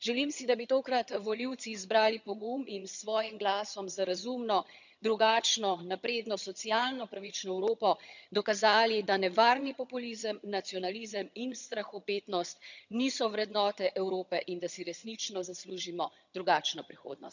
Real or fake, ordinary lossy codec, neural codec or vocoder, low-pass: fake; none; vocoder, 22.05 kHz, 80 mel bands, HiFi-GAN; 7.2 kHz